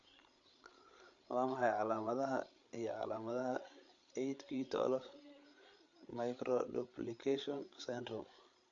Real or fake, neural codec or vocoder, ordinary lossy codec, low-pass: fake; codec, 16 kHz, 8 kbps, FreqCodec, larger model; MP3, 48 kbps; 7.2 kHz